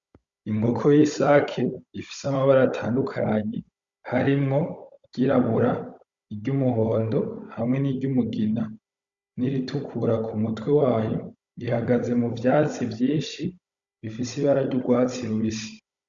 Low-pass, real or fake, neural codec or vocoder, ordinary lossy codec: 7.2 kHz; fake; codec, 16 kHz, 16 kbps, FunCodec, trained on Chinese and English, 50 frames a second; Opus, 64 kbps